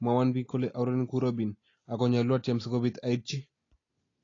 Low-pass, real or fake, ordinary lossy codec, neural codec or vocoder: 7.2 kHz; real; AAC, 32 kbps; none